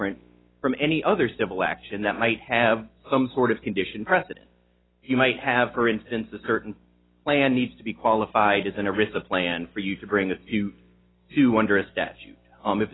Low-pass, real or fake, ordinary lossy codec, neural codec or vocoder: 7.2 kHz; real; AAC, 16 kbps; none